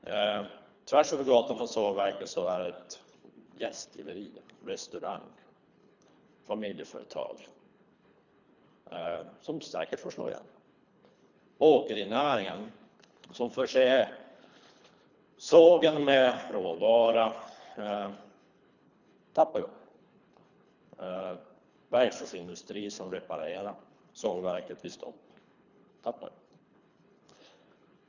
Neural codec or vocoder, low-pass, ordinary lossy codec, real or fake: codec, 24 kHz, 3 kbps, HILCodec; 7.2 kHz; none; fake